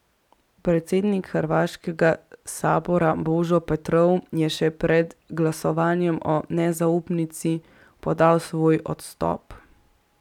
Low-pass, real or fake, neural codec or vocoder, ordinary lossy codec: 19.8 kHz; real; none; none